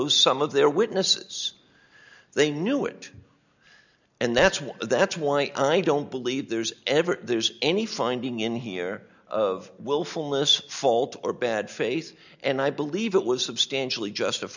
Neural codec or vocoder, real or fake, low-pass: none; real; 7.2 kHz